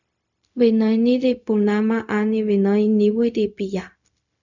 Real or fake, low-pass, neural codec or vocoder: fake; 7.2 kHz; codec, 16 kHz, 0.4 kbps, LongCat-Audio-Codec